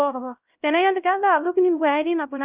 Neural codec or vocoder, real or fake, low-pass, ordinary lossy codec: codec, 16 kHz, 0.5 kbps, X-Codec, HuBERT features, trained on LibriSpeech; fake; 3.6 kHz; Opus, 32 kbps